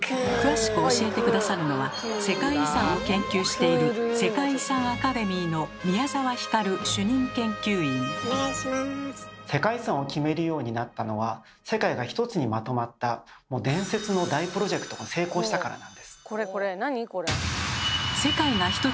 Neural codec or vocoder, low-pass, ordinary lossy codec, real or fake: none; none; none; real